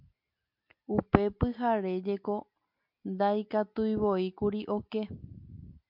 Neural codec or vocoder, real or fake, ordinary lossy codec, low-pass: none; real; MP3, 48 kbps; 5.4 kHz